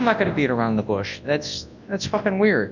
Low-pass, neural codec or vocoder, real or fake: 7.2 kHz; codec, 24 kHz, 0.9 kbps, WavTokenizer, large speech release; fake